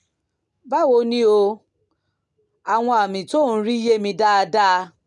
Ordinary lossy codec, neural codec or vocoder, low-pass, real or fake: none; none; 10.8 kHz; real